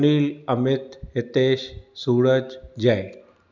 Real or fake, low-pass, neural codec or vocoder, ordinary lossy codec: real; 7.2 kHz; none; none